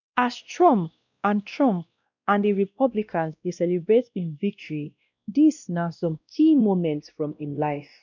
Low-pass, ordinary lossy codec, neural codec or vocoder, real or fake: 7.2 kHz; none; codec, 16 kHz, 1 kbps, X-Codec, WavLM features, trained on Multilingual LibriSpeech; fake